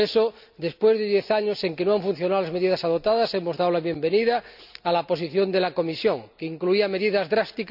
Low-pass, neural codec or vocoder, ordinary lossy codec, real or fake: 5.4 kHz; none; none; real